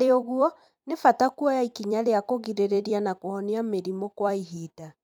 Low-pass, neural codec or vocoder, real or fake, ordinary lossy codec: 19.8 kHz; vocoder, 44.1 kHz, 128 mel bands every 256 samples, BigVGAN v2; fake; none